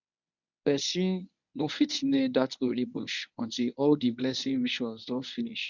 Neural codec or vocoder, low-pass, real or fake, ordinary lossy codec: codec, 24 kHz, 0.9 kbps, WavTokenizer, medium speech release version 1; 7.2 kHz; fake; none